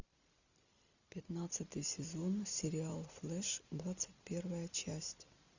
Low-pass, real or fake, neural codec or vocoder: 7.2 kHz; real; none